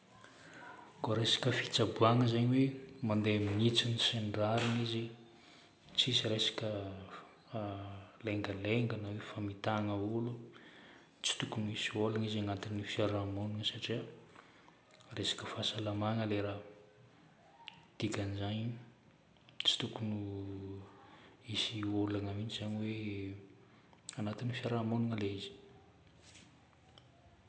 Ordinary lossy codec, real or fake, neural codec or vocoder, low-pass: none; real; none; none